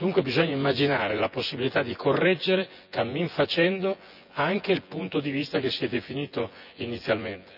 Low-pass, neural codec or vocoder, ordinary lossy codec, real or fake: 5.4 kHz; vocoder, 24 kHz, 100 mel bands, Vocos; none; fake